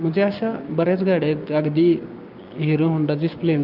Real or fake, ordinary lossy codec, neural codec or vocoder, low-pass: fake; Opus, 24 kbps; codec, 16 kHz, 6 kbps, DAC; 5.4 kHz